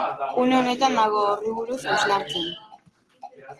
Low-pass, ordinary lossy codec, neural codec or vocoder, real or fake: 10.8 kHz; Opus, 16 kbps; none; real